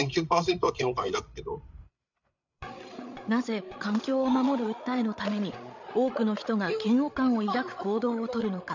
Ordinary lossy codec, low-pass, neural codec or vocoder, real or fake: none; 7.2 kHz; codec, 16 kHz, 8 kbps, FreqCodec, larger model; fake